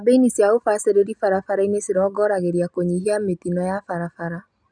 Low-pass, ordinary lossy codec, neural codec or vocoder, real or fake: 9.9 kHz; none; none; real